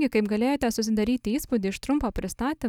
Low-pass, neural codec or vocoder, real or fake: 19.8 kHz; none; real